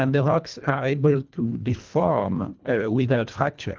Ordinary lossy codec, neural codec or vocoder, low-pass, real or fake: Opus, 32 kbps; codec, 24 kHz, 1.5 kbps, HILCodec; 7.2 kHz; fake